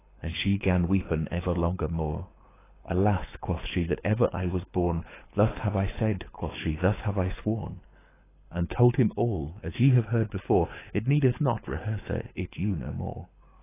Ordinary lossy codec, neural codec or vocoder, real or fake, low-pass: AAC, 16 kbps; codec, 24 kHz, 6 kbps, HILCodec; fake; 3.6 kHz